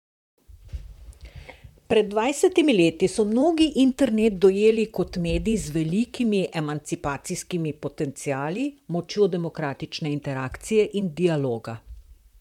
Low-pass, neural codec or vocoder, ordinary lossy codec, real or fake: 19.8 kHz; vocoder, 44.1 kHz, 128 mel bands, Pupu-Vocoder; MP3, 96 kbps; fake